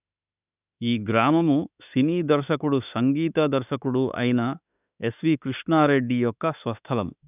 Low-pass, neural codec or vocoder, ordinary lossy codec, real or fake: 3.6 kHz; autoencoder, 48 kHz, 32 numbers a frame, DAC-VAE, trained on Japanese speech; none; fake